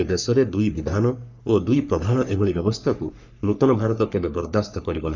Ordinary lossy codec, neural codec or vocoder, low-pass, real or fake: none; codec, 44.1 kHz, 3.4 kbps, Pupu-Codec; 7.2 kHz; fake